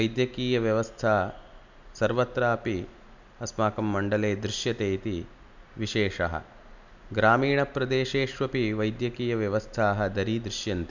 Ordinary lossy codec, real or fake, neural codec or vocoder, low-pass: none; real; none; 7.2 kHz